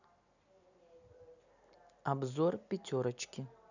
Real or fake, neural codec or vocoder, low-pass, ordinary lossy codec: real; none; 7.2 kHz; none